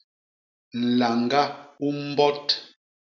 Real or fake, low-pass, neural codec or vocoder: real; 7.2 kHz; none